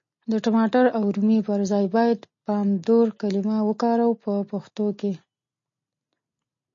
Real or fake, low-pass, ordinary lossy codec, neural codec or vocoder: real; 7.2 kHz; MP3, 32 kbps; none